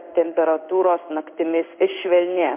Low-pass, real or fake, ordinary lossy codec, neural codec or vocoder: 3.6 kHz; fake; MP3, 24 kbps; codec, 16 kHz in and 24 kHz out, 1 kbps, XY-Tokenizer